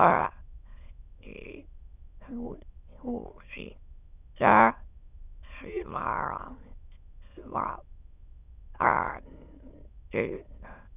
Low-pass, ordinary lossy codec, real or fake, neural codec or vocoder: 3.6 kHz; none; fake; autoencoder, 22.05 kHz, a latent of 192 numbers a frame, VITS, trained on many speakers